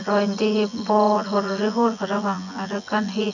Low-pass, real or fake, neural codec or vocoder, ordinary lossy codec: 7.2 kHz; fake; vocoder, 24 kHz, 100 mel bands, Vocos; none